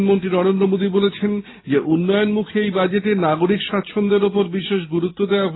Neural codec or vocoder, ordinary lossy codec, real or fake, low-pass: none; AAC, 16 kbps; real; 7.2 kHz